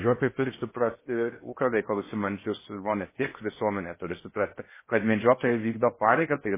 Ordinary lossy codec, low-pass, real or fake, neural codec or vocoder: MP3, 16 kbps; 3.6 kHz; fake; codec, 16 kHz in and 24 kHz out, 0.6 kbps, FocalCodec, streaming, 4096 codes